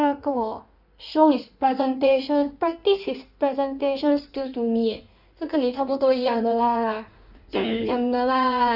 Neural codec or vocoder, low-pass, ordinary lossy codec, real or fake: codec, 16 kHz in and 24 kHz out, 1.1 kbps, FireRedTTS-2 codec; 5.4 kHz; none; fake